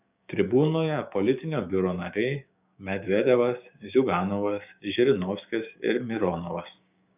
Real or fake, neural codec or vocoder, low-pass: fake; codec, 16 kHz, 6 kbps, DAC; 3.6 kHz